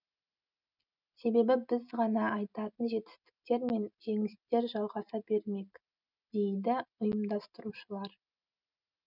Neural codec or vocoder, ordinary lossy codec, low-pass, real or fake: none; none; 5.4 kHz; real